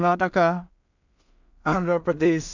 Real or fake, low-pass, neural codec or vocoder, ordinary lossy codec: fake; 7.2 kHz; codec, 16 kHz in and 24 kHz out, 0.4 kbps, LongCat-Audio-Codec, two codebook decoder; none